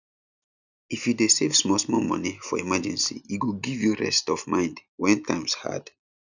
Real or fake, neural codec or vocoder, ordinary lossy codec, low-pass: real; none; none; 7.2 kHz